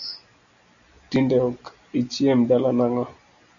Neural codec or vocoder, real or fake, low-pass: none; real; 7.2 kHz